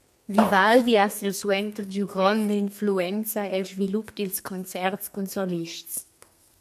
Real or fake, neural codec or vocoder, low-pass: fake; codec, 32 kHz, 1.9 kbps, SNAC; 14.4 kHz